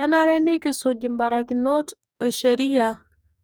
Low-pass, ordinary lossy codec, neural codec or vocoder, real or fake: none; none; codec, 44.1 kHz, 2.6 kbps, DAC; fake